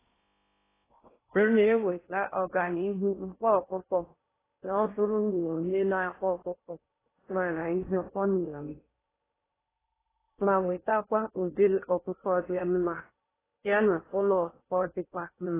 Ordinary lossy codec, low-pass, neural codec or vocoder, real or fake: AAC, 16 kbps; 3.6 kHz; codec, 16 kHz in and 24 kHz out, 0.6 kbps, FocalCodec, streaming, 4096 codes; fake